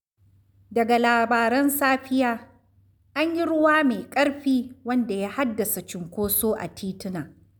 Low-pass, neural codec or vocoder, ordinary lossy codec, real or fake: none; none; none; real